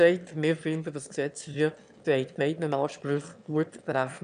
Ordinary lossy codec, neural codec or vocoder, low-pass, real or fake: none; autoencoder, 22.05 kHz, a latent of 192 numbers a frame, VITS, trained on one speaker; 9.9 kHz; fake